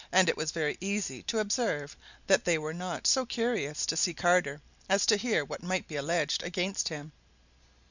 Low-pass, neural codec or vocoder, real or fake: 7.2 kHz; none; real